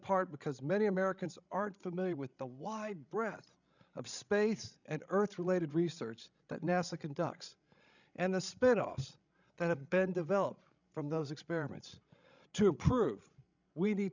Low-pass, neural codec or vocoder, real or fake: 7.2 kHz; codec, 16 kHz, 16 kbps, FreqCodec, larger model; fake